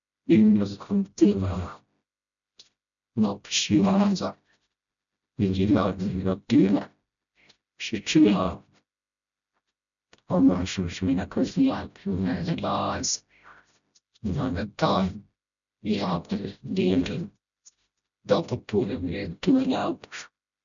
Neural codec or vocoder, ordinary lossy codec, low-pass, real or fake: codec, 16 kHz, 0.5 kbps, FreqCodec, smaller model; none; 7.2 kHz; fake